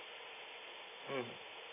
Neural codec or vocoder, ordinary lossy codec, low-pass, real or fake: none; AAC, 16 kbps; 3.6 kHz; real